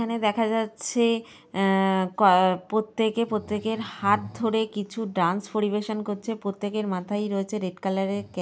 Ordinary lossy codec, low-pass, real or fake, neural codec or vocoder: none; none; real; none